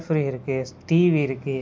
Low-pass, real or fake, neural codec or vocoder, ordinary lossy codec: none; real; none; none